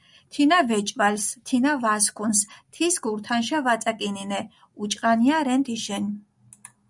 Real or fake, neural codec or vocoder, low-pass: real; none; 10.8 kHz